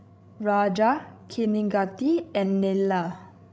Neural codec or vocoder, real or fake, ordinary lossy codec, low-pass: codec, 16 kHz, 16 kbps, FreqCodec, larger model; fake; none; none